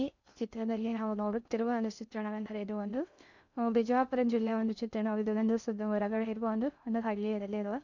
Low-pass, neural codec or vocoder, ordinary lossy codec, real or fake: 7.2 kHz; codec, 16 kHz in and 24 kHz out, 0.6 kbps, FocalCodec, streaming, 2048 codes; none; fake